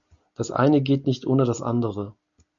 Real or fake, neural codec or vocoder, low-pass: real; none; 7.2 kHz